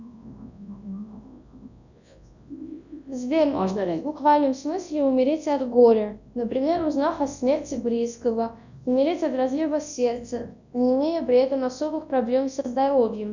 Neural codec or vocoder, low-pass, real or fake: codec, 24 kHz, 0.9 kbps, WavTokenizer, large speech release; 7.2 kHz; fake